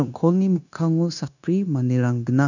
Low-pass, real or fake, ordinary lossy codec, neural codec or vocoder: 7.2 kHz; fake; none; codec, 16 kHz, 0.9 kbps, LongCat-Audio-Codec